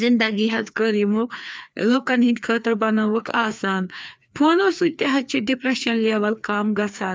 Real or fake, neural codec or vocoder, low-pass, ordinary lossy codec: fake; codec, 16 kHz, 2 kbps, FreqCodec, larger model; none; none